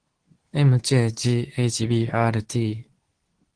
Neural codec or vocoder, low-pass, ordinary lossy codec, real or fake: codec, 24 kHz, 0.9 kbps, WavTokenizer, medium speech release version 2; 9.9 kHz; Opus, 16 kbps; fake